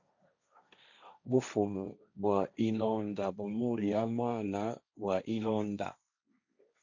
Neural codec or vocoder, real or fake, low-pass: codec, 16 kHz, 1.1 kbps, Voila-Tokenizer; fake; 7.2 kHz